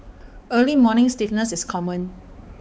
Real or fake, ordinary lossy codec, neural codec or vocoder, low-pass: fake; none; codec, 16 kHz, 4 kbps, X-Codec, HuBERT features, trained on balanced general audio; none